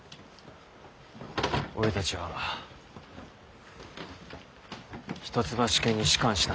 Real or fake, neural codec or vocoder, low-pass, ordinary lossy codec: real; none; none; none